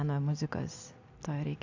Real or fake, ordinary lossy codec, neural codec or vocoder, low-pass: real; AAC, 48 kbps; none; 7.2 kHz